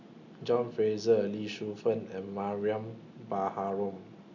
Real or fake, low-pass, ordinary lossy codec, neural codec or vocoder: real; 7.2 kHz; none; none